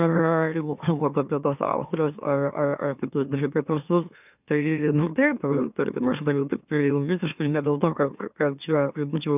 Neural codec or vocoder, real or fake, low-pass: autoencoder, 44.1 kHz, a latent of 192 numbers a frame, MeloTTS; fake; 3.6 kHz